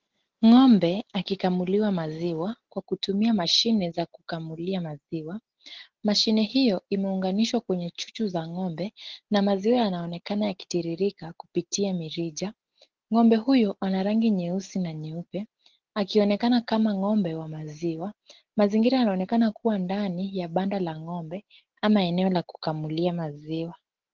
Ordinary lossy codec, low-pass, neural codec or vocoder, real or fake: Opus, 16 kbps; 7.2 kHz; none; real